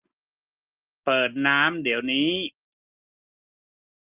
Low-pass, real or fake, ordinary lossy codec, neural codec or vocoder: 3.6 kHz; real; Opus, 24 kbps; none